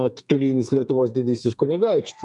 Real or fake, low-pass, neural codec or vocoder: fake; 10.8 kHz; codec, 32 kHz, 1.9 kbps, SNAC